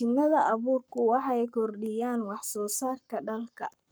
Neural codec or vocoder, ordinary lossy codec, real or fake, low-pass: codec, 44.1 kHz, 7.8 kbps, Pupu-Codec; none; fake; none